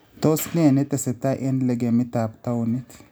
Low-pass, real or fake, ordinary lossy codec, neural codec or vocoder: none; real; none; none